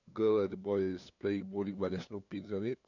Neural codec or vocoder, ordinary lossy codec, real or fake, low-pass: codec, 16 kHz, 2 kbps, FunCodec, trained on LibriTTS, 25 frames a second; none; fake; 7.2 kHz